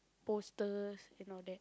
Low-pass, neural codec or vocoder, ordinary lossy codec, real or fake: none; none; none; real